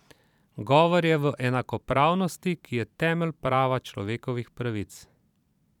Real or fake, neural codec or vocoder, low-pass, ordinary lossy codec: fake; vocoder, 44.1 kHz, 128 mel bands every 512 samples, BigVGAN v2; 19.8 kHz; none